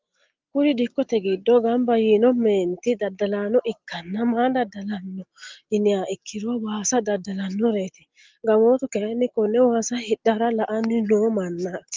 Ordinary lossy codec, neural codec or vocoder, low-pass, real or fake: Opus, 32 kbps; none; 7.2 kHz; real